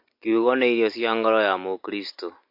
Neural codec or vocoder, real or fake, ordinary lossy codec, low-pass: none; real; MP3, 32 kbps; 5.4 kHz